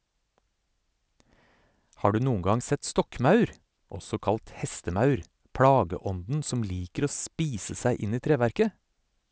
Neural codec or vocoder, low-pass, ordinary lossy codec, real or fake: none; none; none; real